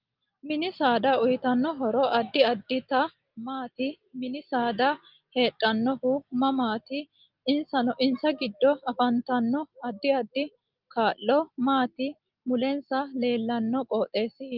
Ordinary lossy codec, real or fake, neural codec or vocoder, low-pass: Opus, 32 kbps; real; none; 5.4 kHz